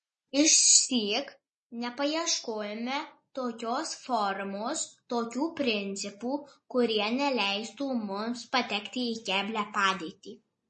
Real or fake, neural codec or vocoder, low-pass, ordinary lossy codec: real; none; 9.9 kHz; MP3, 32 kbps